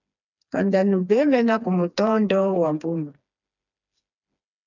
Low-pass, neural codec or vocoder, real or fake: 7.2 kHz; codec, 16 kHz, 2 kbps, FreqCodec, smaller model; fake